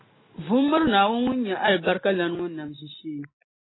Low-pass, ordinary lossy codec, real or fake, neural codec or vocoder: 7.2 kHz; AAC, 16 kbps; real; none